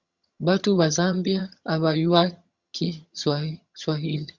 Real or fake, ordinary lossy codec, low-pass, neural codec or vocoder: fake; Opus, 64 kbps; 7.2 kHz; vocoder, 22.05 kHz, 80 mel bands, HiFi-GAN